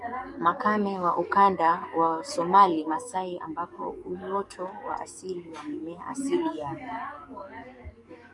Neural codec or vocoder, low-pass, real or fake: codec, 44.1 kHz, 7.8 kbps, DAC; 10.8 kHz; fake